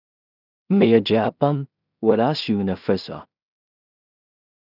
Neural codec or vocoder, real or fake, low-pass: codec, 16 kHz in and 24 kHz out, 0.4 kbps, LongCat-Audio-Codec, two codebook decoder; fake; 5.4 kHz